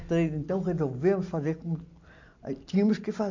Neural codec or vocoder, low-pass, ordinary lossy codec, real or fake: none; 7.2 kHz; AAC, 48 kbps; real